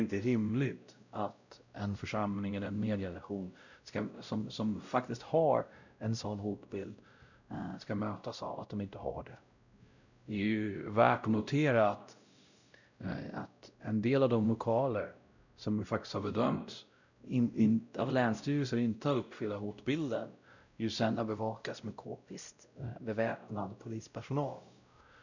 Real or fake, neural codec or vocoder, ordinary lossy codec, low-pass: fake; codec, 16 kHz, 0.5 kbps, X-Codec, WavLM features, trained on Multilingual LibriSpeech; none; 7.2 kHz